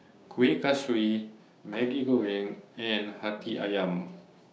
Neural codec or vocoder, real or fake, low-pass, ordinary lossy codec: codec, 16 kHz, 6 kbps, DAC; fake; none; none